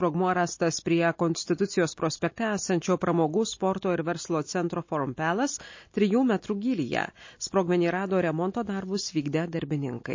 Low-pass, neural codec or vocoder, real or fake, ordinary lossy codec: 7.2 kHz; none; real; MP3, 32 kbps